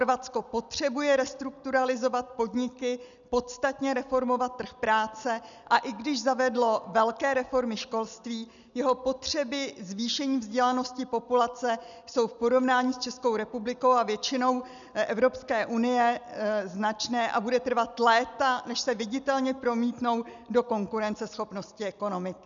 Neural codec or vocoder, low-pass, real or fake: none; 7.2 kHz; real